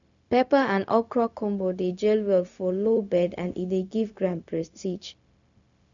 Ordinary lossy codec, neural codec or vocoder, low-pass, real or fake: none; codec, 16 kHz, 0.4 kbps, LongCat-Audio-Codec; 7.2 kHz; fake